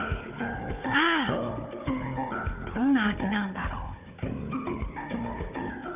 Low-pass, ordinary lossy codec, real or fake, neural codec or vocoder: 3.6 kHz; none; fake; codec, 16 kHz, 4 kbps, FunCodec, trained on Chinese and English, 50 frames a second